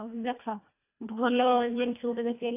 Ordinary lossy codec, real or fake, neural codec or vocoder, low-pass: AAC, 24 kbps; fake; codec, 24 kHz, 1.5 kbps, HILCodec; 3.6 kHz